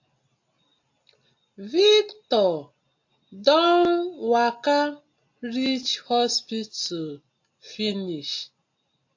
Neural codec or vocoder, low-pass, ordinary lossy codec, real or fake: none; 7.2 kHz; AAC, 48 kbps; real